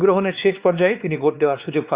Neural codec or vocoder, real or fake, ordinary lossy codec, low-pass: codec, 16 kHz, 2 kbps, X-Codec, WavLM features, trained on Multilingual LibriSpeech; fake; Opus, 64 kbps; 3.6 kHz